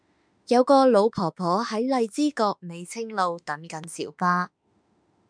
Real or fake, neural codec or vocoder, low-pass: fake; autoencoder, 48 kHz, 32 numbers a frame, DAC-VAE, trained on Japanese speech; 9.9 kHz